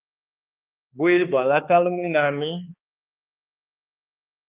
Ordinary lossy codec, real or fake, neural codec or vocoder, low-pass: Opus, 32 kbps; fake; codec, 16 kHz, 2 kbps, X-Codec, HuBERT features, trained on balanced general audio; 3.6 kHz